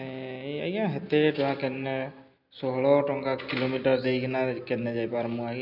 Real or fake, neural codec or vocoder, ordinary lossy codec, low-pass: real; none; none; 5.4 kHz